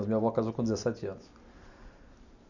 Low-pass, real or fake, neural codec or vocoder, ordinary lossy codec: 7.2 kHz; real; none; none